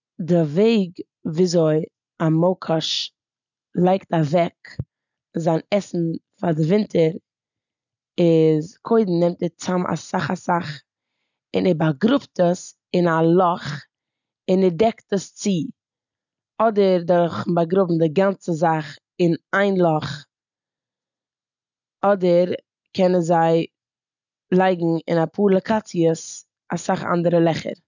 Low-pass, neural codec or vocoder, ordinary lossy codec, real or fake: 7.2 kHz; none; none; real